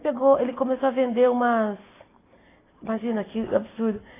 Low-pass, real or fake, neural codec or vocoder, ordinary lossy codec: 3.6 kHz; real; none; AAC, 16 kbps